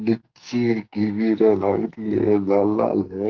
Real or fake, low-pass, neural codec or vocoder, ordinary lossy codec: fake; 7.2 kHz; codec, 44.1 kHz, 2.6 kbps, SNAC; Opus, 32 kbps